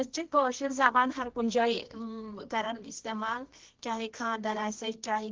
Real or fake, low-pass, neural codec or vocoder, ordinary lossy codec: fake; 7.2 kHz; codec, 24 kHz, 0.9 kbps, WavTokenizer, medium music audio release; Opus, 16 kbps